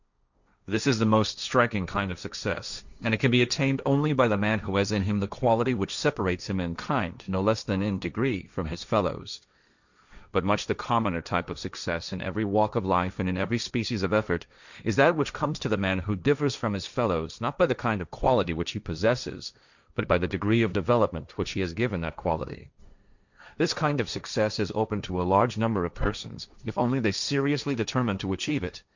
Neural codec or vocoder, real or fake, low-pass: codec, 16 kHz, 1.1 kbps, Voila-Tokenizer; fake; 7.2 kHz